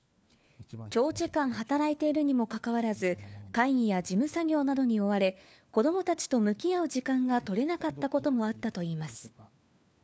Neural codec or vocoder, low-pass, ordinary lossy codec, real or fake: codec, 16 kHz, 4 kbps, FunCodec, trained on LibriTTS, 50 frames a second; none; none; fake